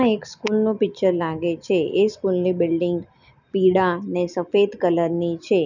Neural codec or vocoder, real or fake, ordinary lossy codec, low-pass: none; real; none; 7.2 kHz